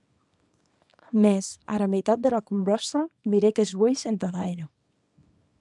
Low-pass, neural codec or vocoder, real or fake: 10.8 kHz; codec, 24 kHz, 0.9 kbps, WavTokenizer, small release; fake